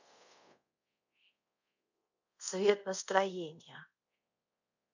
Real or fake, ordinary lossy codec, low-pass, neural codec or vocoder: fake; none; 7.2 kHz; codec, 24 kHz, 0.5 kbps, DualCodec